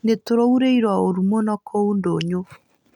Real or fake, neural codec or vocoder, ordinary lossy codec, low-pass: real; none; none; 19.8 kHz